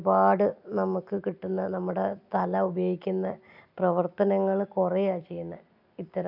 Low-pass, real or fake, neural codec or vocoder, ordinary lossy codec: 5.4 kHz; real; none; none